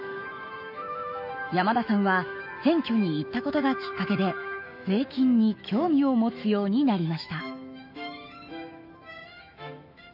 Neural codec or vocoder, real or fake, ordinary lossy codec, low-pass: autoencoder, 48 kHz, 128 numbers a frame, DAC-VAE, trained on Japanese speech; fake; none; 5.4 kHz